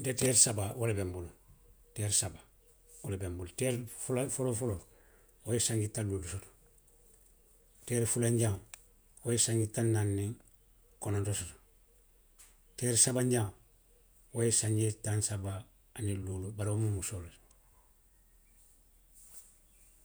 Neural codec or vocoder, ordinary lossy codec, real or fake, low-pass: vocoder, 48 kHz, 128 mel bands, Vocos; none; fake; none